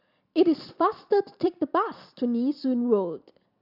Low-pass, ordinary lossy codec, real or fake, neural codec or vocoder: 5.4 kHz; none; fake; vocoder, 44.1 kHz, 128 mel bands every 256 samples, BigVGAN v2